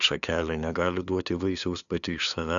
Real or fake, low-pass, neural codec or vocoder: fake; 7.2 kHz; codec, 16 kHz, 2 kbps, FunCodec, trained on LibriTTS, 25 frames a second